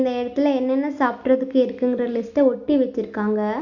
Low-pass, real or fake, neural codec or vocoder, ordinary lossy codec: 7.2 kHz; real; none; none